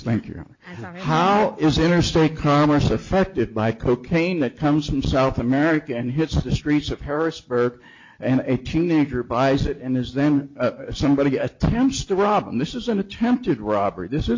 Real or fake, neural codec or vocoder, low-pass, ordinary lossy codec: real; none; 7.2 kHz; MP3, 48 kbps